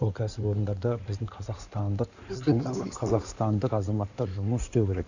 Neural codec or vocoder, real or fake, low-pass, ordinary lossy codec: codec, 16 kHz in and 24 kHz out, 2.2 kbps, FireRedTTS-2 codec; fake; 7.2 kHz; none